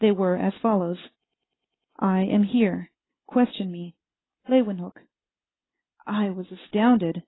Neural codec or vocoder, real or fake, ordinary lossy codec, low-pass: none; real; AAC, 16 kbps; 7.2 kHz